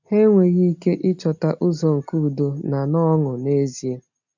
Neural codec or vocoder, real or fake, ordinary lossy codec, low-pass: none; real; none; 7.2 kHz